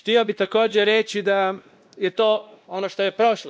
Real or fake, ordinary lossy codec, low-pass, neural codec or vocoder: fake; none; none; codec, 16 kHz, 1 kbps, X-Codec, WavLM features, trained on Multilingual LibriSpeech